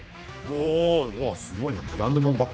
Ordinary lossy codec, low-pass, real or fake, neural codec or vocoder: none; none; fake; codec, 16 kHz, 2 kbps, X-Codec, HuBERT features, trained on general audio